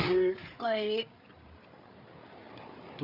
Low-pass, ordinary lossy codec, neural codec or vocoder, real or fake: 5.4 kHz; none; codec, 16 kHz, 8 kbps, FreqCodec, larger model; fake